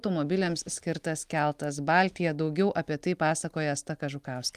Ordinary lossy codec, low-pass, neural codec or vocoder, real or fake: Opus, 32 kbps; 14.4 kHz; none; real